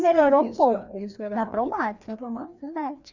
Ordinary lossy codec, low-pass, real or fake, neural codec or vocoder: none; 7.2 kHz; fake; codec, 16 kHz, 2 kbps, FreqCodec, larger model